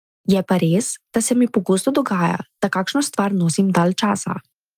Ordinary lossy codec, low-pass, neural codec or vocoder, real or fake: Opus, 32 kbps; 14.4 kHz; none; real